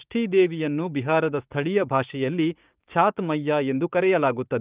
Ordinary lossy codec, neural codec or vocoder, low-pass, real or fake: Opus, 32 kbps; codec, 16 kHz, 6 kbps, DAC; 3.6 kHz; fake